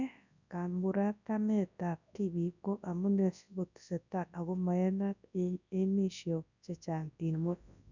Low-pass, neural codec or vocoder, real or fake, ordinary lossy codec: 7.2 kHz; codec, 24 kHz, 0.9 kbps, WavTokenizer, large speech release; fake; none